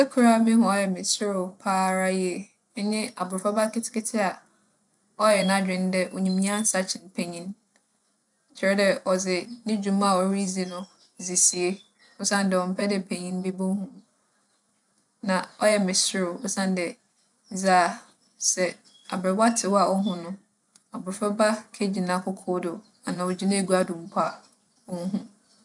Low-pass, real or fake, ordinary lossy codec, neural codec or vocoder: 10.8 kHz; fake; none; vocoder, 24 kHz, 100 mel bands, Vocos